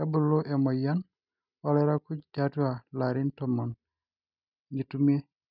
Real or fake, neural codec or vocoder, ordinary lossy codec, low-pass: real; none; none; 5.4 kHz